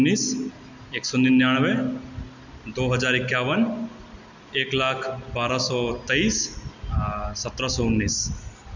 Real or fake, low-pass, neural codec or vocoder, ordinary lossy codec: real; 7.2 kHz; none; none